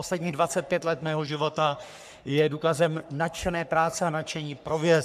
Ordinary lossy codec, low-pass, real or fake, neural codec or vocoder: MP3, 96 kbps; 14.4 kHz; fake; codec, 44.1 kHz, 3.4 kbps, Pupu-Codec